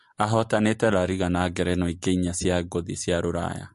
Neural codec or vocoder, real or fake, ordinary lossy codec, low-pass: none; real; MP3, 48 kbps; 14.4 kHz